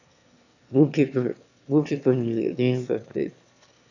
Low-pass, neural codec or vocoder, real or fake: 7.2 kHz; autoencoder, 22.05 kHz, a latent of 192 numbers a frame, VITS, trained on one speaker; fake